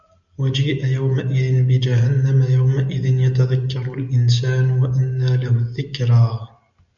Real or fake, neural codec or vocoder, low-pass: real; none; 7.2 kHz